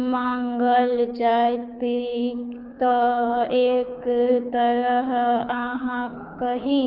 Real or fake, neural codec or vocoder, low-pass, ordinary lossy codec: fake; codec, 24 kHz, 6 kbps, HILCodec; 5.4 kHz; none